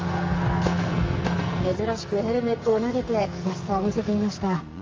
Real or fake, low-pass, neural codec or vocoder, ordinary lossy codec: fake; 7.2 kHz; codec, 44.1 kHz, 2.6 kbps, SNAC; Opus, 32 kbps